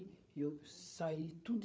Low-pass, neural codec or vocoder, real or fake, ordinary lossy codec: none; codec, 16 kHz, 8 kbps, FreqCodec, larger model; fake; none